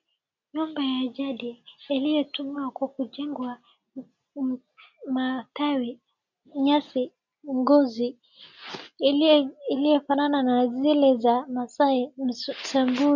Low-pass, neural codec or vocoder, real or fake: 7.2 kHz; none; real